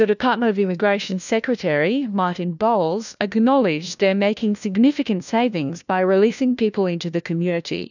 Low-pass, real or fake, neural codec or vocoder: 7.2 kHz; fake; codec, 16 kHz, 1 kbps, FunCodec, trained on LibriTTS, 50 frames a second